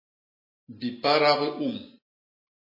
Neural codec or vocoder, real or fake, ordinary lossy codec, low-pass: none; real; MP3, 24 kbps; 5.4 kHz